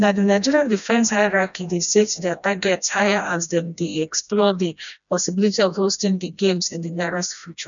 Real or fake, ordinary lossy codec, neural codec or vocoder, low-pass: fake; none; codec, 16 kHz, 1 kbps, FreqCodec, smaller model; 7.2 kHz